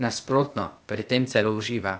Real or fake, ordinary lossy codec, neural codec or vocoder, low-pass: fake; none; codec, 16 kHz, 0.8 kbps, ZipCodec; none